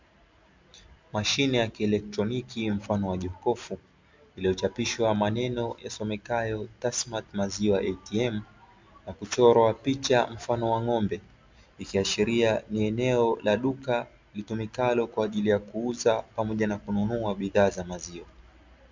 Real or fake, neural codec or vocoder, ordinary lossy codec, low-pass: real; none; MP3, 64 kbps; 7.2 kHz